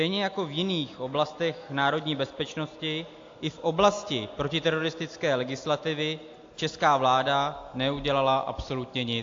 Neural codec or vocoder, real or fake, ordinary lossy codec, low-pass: none; real; AAC, 48 kbps; 7.2 kHz